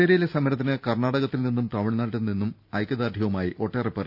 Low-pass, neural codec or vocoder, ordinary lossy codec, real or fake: 5.4 kHz; none; none; real